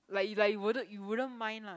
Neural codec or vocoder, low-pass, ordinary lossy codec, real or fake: none; none; none; real